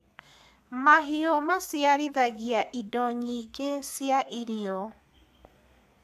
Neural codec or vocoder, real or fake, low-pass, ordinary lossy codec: codec, 32 kHz, 1.9 kbps, SNAC; fake; 14.4 kHz; AAC, 96 kbps